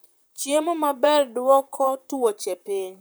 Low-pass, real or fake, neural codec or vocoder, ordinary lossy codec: none; fake; vocoder, 44.1 kHz, 128 mel bands, Pupu-Vocoder; none